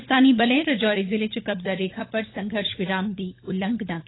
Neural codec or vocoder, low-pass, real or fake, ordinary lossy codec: codec, 16 kHz, 16 kbps, FunCodec, trained on LibriTTS, 50 frames a second; 7.2 kHz; fake; AAC, 16 kbps